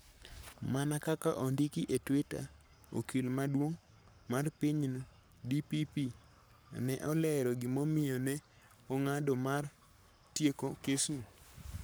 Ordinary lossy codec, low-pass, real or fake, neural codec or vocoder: none; none; fake; codec, 44.1 kHz, 7.8 kbps, Pupu-Codec